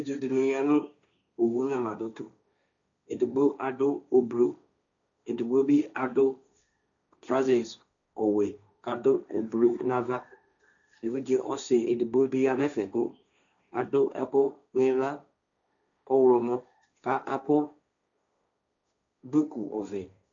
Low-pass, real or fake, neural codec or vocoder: 7.2 kHz; fake; codec, 16 kHz, 1.1 kbps, Voila-Tokenizer